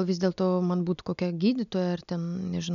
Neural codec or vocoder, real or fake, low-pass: none; real; 7.2 kHz